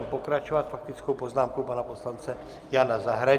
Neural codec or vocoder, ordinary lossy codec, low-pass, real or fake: none; Opus, 32 kbps; 14.4 kHz; real